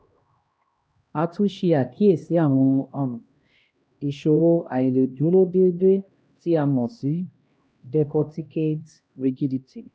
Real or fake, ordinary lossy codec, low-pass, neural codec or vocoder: fake; none; none; codec, 16 kHz, 1 kbps, X-Codec, HuBERT features, trained on LibriSpeech